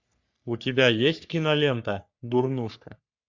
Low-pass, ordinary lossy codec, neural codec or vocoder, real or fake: 7.2 kHz; MP3, 64 kbps; codec, 44.1 kHz, 3.4 kbps, Pupu-Codec; fake